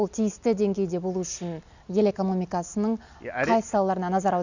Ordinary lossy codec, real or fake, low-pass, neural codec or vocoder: none; real; 7.2 kHz; none